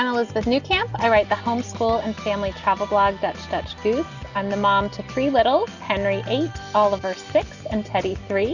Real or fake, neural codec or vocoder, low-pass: real; none; 7.2 kHz